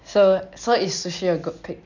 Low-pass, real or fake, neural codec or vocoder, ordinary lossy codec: 7.2 kHz; real; none; none